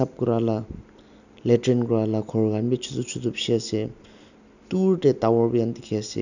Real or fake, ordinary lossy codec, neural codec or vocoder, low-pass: real; none; none; 7.2 kHz